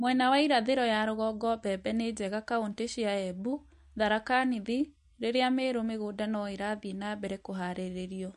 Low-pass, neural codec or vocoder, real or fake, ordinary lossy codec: 14.4 kHz; none; real; MP3, 48 kbps